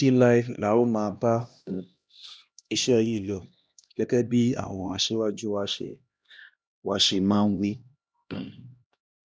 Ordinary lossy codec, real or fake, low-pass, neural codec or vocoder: none; fake; none; codec, 16 kHz, 1 kbps, X-Codec, HuBERT features, trained on LibriSpeech